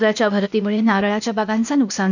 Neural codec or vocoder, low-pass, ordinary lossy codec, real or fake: codec, 16 kHz, 0.8 kbps, ZipCodec; 7.2 kHz; none; fake